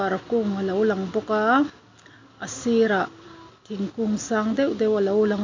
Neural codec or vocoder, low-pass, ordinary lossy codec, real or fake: none; 7.2 kHz; MP3, 48 kbps; real